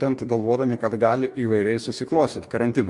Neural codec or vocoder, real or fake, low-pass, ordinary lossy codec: codec, 44.1 kHz, 2.6 kbps, DAC; fake; 10.8 kHz; MP3, 64 kbps